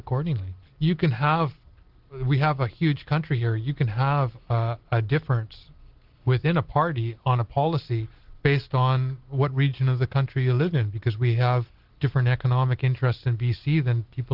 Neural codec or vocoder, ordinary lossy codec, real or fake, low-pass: none; Opus, 16 kbps; real; 5.4 kHz